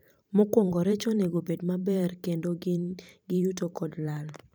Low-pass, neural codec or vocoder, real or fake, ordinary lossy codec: none; vocoder, 44.1 kHz, 128 mel bands every 512 samples, BigVGAN v2; fake; none